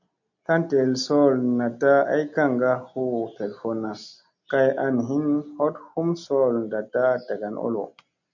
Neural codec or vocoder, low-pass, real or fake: none; 7.2 kHz; real